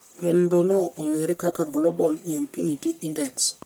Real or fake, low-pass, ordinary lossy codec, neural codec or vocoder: fake; none; none; codec, 44.1 kHz, 1.7 kbps, Pupu-Codec